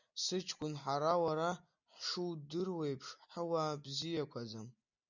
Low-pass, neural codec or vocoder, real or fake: 7.2 kHz; none; real